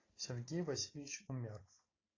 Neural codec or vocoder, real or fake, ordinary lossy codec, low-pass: none; real; AAC, 32 kbps; 7.2 kHz